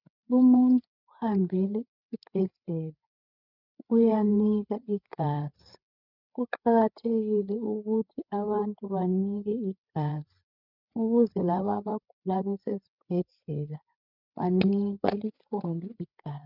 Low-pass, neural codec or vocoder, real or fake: 5.4 kHz; codec, 16 kHz, 8 kbps, FreqCodec, larger model; fake